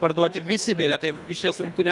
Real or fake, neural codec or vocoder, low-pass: fake; codec, 24 kHz, 1.5 kbps, HILCodec; 10.8 kHz